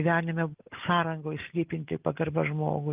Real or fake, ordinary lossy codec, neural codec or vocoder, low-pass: real; Opus, 24 kbps; none; 3.6 kHz